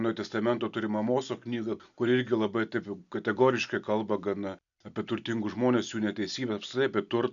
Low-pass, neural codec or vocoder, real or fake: 7.2 kHz; none; real